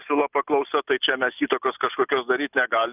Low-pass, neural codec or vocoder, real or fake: 3.6 kHz; none; real